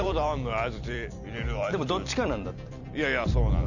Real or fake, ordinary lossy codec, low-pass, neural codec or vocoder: fake; none; 7.2 kHz; vocoder, 44.1 kHz, 128 mel bands every 256 samples, BigVGAN v2